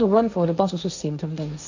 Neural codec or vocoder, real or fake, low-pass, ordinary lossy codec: codec, 16 kHz, 1.1 kbps, Voila-Tokenizer; fake; 7.2 kHz; none